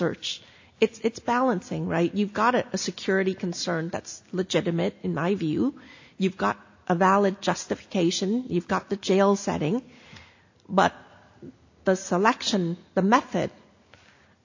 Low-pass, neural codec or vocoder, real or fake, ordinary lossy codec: 7.2 kHz; none; real; AAC, 48 kbps